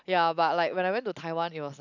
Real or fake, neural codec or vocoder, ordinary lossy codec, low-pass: real; none; none; 7.2 kHz